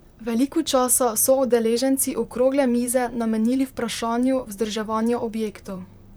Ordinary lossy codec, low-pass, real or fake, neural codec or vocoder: none; none; fake; vocoder, 44.1 kHz, 128 mel bands, Pupu-Vocoder